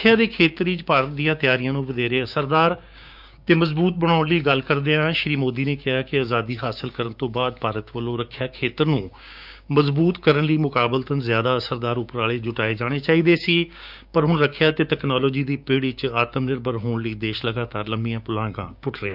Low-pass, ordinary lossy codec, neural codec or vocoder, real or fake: 5.4 kHz; none; codec, 16 kHz, 6 kbps, DAC; fake